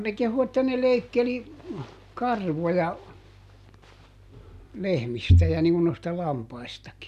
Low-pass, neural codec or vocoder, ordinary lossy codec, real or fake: 14.4 kHz; none; none; real